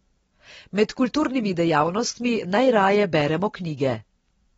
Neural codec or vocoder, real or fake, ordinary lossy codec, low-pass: none; real; AAC, 24 kbps; 10.8 kHz